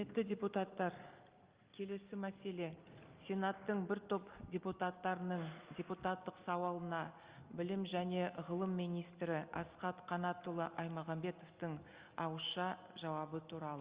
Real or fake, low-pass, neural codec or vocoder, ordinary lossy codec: real; 3.6 kHz; none; Opus, 32 kbps